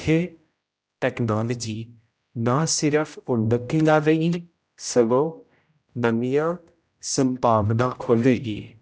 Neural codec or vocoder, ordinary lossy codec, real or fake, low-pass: codec, 16 kHz, 0.5 kbps, X-Codec, HuBERT features, trained on general audio; none; fake; none